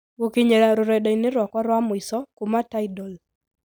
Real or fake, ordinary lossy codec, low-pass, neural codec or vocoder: real; none; none; none